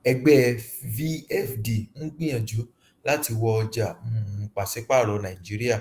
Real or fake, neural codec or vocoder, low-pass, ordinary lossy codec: fake; autoencoder, 48 kHz, 128 numbers a frame, DAC-VAE, trained on Japanese speech; 14.4 kHz; Opus, 32 kbps